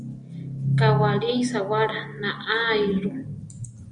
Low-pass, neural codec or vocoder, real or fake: 9.9 kHz; none; real